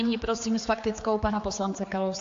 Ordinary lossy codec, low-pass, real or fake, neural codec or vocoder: AAC, 48 kbps; 7.2 kHz; fake; codec, 16 kHz, 4 kbps, X-Codec, HuBERT features, trained on general audio